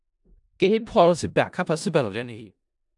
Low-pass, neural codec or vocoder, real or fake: 10.8 kHz; codec, 16 kHz in and 24 kHz out, 0.4 kbps, LongCat-Audio-Codec, four codebook decoder; fake